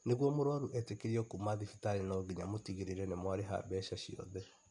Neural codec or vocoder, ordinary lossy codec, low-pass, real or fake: none; MP3, 64 kbps; 10.8 kHz; real